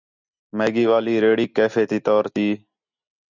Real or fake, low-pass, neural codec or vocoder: real; 7.2 kHz; none